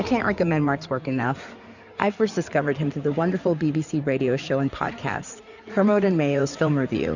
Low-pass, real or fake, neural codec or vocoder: 7.2 kHz; fake; codec, 16 kHz in and 24 kHz out, 2.2 kbps, FireRedTTS-2 codec